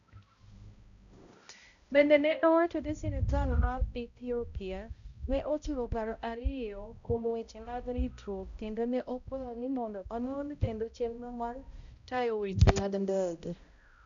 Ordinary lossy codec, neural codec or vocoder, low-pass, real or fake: none; codec, 16 kHz, 0.5 kbps, X-Codec, HuBERT features, trained on balanced general audio; 7.2 kHz; fake